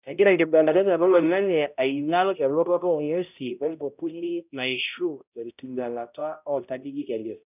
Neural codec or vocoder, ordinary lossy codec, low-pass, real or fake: codec, 16 kHz, 0.5 kbps, X-Codec, HuBERT features, trained on balanced general audio; none; 3.6 kHz; fake